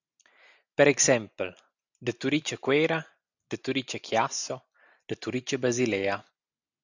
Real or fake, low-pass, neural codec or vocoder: real; 7.2 kHz; none